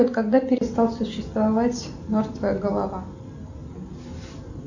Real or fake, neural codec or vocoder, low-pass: real; none; 7.2 kHz